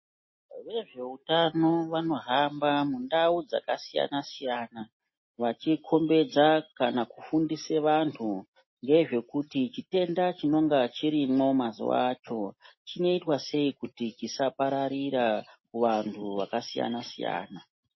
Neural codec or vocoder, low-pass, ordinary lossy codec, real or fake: none; 7.2 kHz; MP3, 24 kbps; real